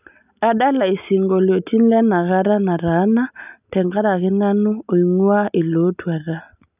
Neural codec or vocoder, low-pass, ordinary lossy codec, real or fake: none; 3.6 kHz; none; real